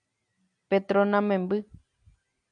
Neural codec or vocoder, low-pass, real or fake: none; 9.9 kHz; real